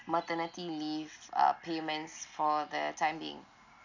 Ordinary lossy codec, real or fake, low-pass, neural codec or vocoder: none; real; 7.2 kHz; none